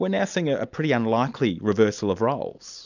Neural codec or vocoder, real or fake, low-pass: none; real; 7.2 kHz